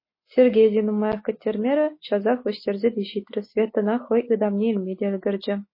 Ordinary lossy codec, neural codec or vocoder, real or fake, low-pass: MP3, 24 kbps; none; real; 5.4 kHz